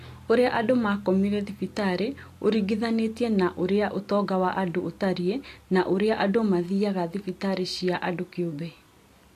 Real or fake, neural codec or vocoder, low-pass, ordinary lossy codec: real; none; 14.4 kHz; AAC, 64 kbps